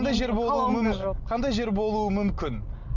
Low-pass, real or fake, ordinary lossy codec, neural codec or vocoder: 7.2 kHz; real; none; none